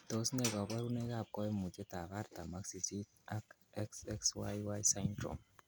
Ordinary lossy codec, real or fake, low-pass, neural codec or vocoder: none; real; none; none